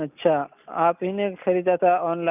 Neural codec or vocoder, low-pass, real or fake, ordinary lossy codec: none; 3.6 kHz; real; none